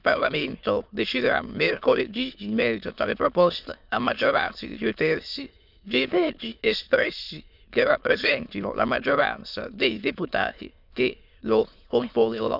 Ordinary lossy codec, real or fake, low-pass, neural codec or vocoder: none; fake; 5.4 kHz; autoencoder, 22.05 kHz, a latent of 192 numbers a frame, VITS, trained on many speakers